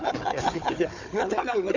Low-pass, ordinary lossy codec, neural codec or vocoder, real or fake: 7.2 kHz; none; codec, 16 kHz, 4 kbps, FunCodec, trained on Chinese and English, 50 frames a second; fake